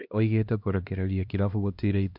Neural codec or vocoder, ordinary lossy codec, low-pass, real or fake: codec, 16 kHz, 1 kbps, X-Codec, HuBERT features, trained on LibriSpeech; MP3, 48 kbps; 5.4 kHz; fake